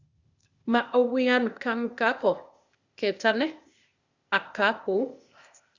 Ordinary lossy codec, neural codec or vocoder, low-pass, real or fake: Opus, 64 kbps; codec, 16 kHz, 0.8 kbps, ZipCodec; 7.2 kHz; fake